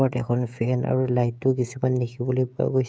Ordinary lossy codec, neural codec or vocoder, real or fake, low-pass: none; codec, 16 kHz, 16 kbps, FreqCodec, smaller model; fake; none